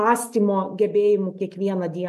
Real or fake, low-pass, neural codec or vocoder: real; 14.4 kHz; none